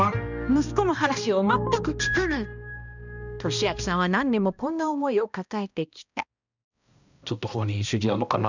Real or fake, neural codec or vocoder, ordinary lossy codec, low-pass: fake; codec, 16 kHz, 1 kbps, X-Codec, HuBERT features, trained on balanced general audio; none; 7.2 kHz